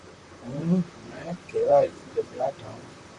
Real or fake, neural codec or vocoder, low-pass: fake; vocoder, 44.1 kHz, 128 mel bands, Pupu-Vocoder; 10.8 kHz